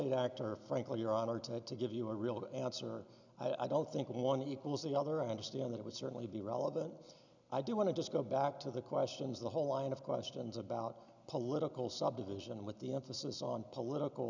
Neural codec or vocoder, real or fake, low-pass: none; real; 7.2 kHz